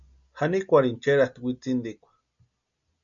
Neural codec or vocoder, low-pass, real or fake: none; 7.2 kHz; real